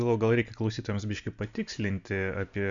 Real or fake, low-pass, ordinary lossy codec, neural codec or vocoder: real; 7.2 kHz; Opus, 64 kbps; none